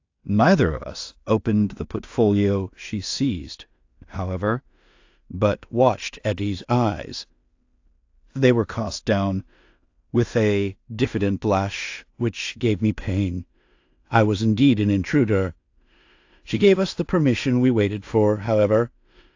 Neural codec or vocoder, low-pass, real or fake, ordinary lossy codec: codec, 16 kHz in and 24 kHz out, 0.4 kbps, LongCat-Audio-Codec, two codebook decoder; 7.2 kHz; fake; AAC, 48 kbps